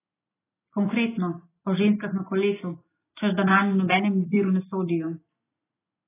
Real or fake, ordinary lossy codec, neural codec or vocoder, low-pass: real; AAC, 16 kbps; none; 3.6 kHz